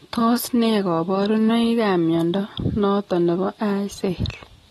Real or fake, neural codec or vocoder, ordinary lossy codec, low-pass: real; none; AAC, 32 kbps; 19.8 kHz